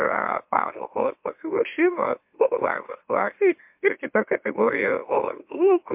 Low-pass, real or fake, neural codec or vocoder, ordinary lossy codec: 3.6 kHz; fake; autoencoder, 44.1 kHz, a latent of 192 numbers a frame, MeloTTS; MP3, 32 kbps